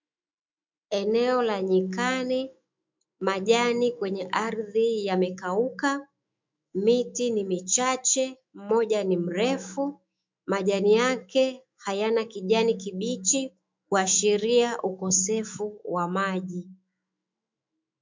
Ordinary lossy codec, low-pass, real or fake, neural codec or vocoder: MP3, 64 kbps; 7.2 kHz; fake; autoencoder, 48 kHz, 128 numbers a frame, DAC-VAE, trained on Japanese speech